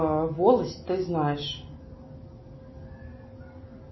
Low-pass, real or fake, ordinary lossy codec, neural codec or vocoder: 7.2 kHz; real; MP3, 24 kbps; none